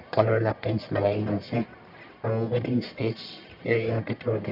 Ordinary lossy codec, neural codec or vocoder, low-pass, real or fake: MP3, 48 kbps; codec, 44.1 kHz, 1.7 kbps, Pupu-Codec; 5.4 kHz; fake